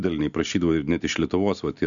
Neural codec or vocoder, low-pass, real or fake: none; 7.2 kHz; real